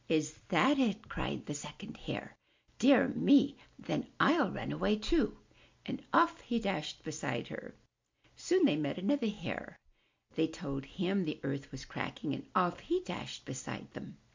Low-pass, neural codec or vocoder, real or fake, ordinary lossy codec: 7.2 kHz; none; real; MP3, 64 kbps